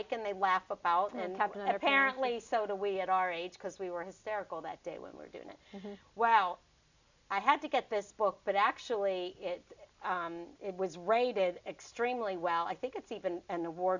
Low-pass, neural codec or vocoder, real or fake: 7.2 kHz; none; real